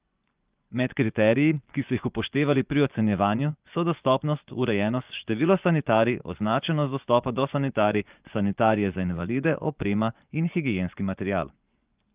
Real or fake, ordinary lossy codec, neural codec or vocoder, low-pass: fake; Opus, 32 kbps; vocoder, 24 kHz, 100 mel bands, Vocos; 3.6 kHz